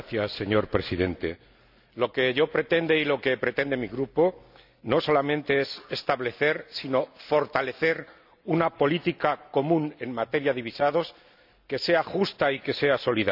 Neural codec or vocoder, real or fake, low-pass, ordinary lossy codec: none; real; 5.4 kHz; none